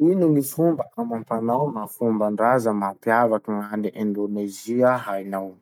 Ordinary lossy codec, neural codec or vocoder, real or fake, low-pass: none; none; real; 19.8 kHz